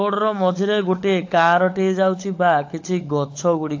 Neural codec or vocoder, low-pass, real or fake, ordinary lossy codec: codec, 16 kHz, 16 kbps, FunCodec, trained on LibriTTS, 50 frames a second; 7.2 kHz; fake; AAC, 48 kbps